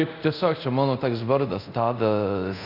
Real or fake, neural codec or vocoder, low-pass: fake; codec, 24 kHz, 0.5 kbps, DualCodec; 5.4 kHz